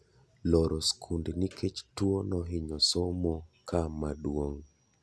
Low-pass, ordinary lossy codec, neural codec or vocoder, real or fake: none; none; none; real